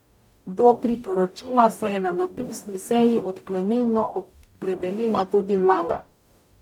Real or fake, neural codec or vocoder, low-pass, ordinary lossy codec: fake; codec, 44.1 kHz, 0.9 kbps, DAC; 19.8 kHz; none